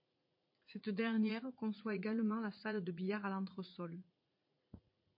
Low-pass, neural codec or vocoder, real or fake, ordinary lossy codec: 5.4 kHz; vocoder, 44.1 kHz, 80 mel bands, Vocos; fake; MP3, 32 kbps